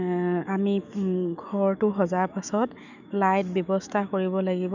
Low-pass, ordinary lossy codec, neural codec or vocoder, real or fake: 7.2 kHz; none; autoencoder, 48 kHz, 128 numbers a frame, DAC-VAE, trained on Japanese speech; fake